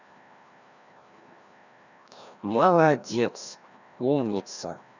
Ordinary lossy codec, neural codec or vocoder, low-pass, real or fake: none; codec, 16 kHz, 1 kbps, FreqCodec, larger model; 7.2 kHz; fake